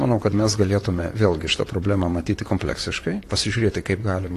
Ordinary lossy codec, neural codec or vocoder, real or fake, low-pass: AAC, 48 kbps; vocoder, 44.1 kHz, 128 mel bands, Pupu-Vocoder; fake; 14.4 kHz